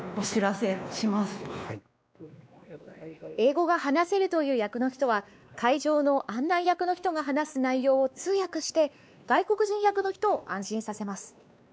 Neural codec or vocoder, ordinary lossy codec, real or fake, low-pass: codec, 16 kHz, 2 kbps, X-Codec, WavLM features, trained on Multilingual LibriSpeech; none; fake; none